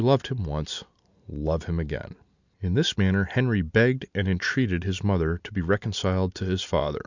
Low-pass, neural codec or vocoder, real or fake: 7.2 kHz; none; real